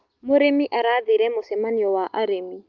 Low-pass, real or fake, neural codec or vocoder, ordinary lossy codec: 7.2 kHz; real; none; Opus, 32 kbps